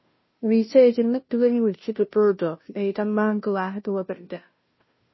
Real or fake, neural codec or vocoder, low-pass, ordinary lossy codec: fake; codec, 16 kHz, 0.5 kbps, FunCodec, trained on Chinese and English, 25 frames a second; 7.2 kHz; MP3, 24 kbps